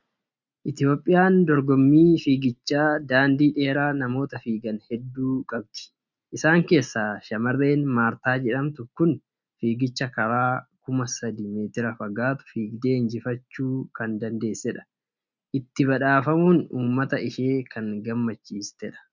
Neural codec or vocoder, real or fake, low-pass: none; real; 7.2 kHz